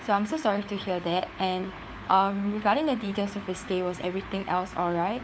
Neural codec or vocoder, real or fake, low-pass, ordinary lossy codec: codec, 16 kHz, 4 kbps, FunCodec, trained on LibriTTS, 50 frames a second; fake; none; none